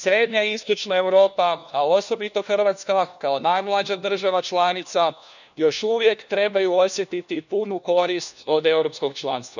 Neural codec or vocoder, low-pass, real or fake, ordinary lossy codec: codec, 16 kHz, 1 kbps, FunCodec, trained on LibriTTS, 50 frames a second; 7.2 kHz; fake; none